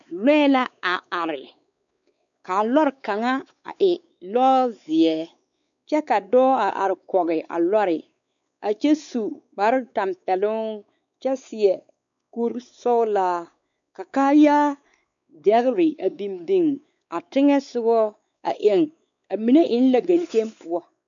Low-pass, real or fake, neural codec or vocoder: 7.2 kHz; fake; codec, 16 kHz, 4 kbps, X-Codec, WavLM features, trained on Multilingual LibriSpeech